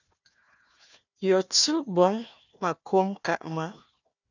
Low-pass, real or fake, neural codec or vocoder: 7.2 kHz; fake; codec, 16 kHz, 1 kbps, FunCodec, trained on Chinese and English, 50 frames a second